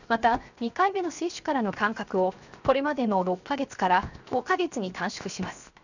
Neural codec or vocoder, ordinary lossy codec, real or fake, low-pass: codec, 16 kHz, 0.7 kbps, FocalCodec; none; fake; 7.2 kHz